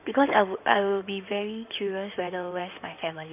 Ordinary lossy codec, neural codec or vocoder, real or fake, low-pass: none; codec, 16 kHz in and 24 kHz out, 2.2 kbps, FireRedTTS-2 codec; fake; 3.6 kHz